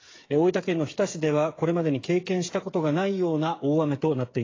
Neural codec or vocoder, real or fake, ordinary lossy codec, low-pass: codec, 16 kHz, 8 kbps, FreqCodec, smaller model; fake; AAC, 32 kbps; 7.2 kHz